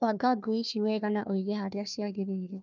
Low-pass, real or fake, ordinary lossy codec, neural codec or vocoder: 7.2 kHz; fake; none; codec, 16 kHz, 1 kbps, FunCodec, trained on Chinese and English, 50 frames a second